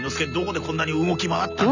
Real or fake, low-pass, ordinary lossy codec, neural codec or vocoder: real; 7.2 kHz; none; none